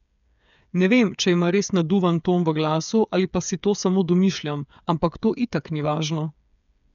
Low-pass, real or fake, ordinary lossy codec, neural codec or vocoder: 7.2 kHz; fake; none; codec, 16 kHz, 8 kbps, FreqCodec, smaller model